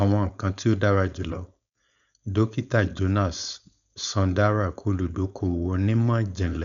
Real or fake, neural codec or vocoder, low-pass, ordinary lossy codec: fake; codec, 16 kHz, 4.8 kbps, FACodec; 7.2 kHz; none